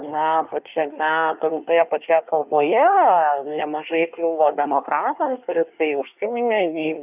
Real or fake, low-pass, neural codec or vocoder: fake; 3.6 kHz; codec, 24 kHz, 1 kbps, SNAC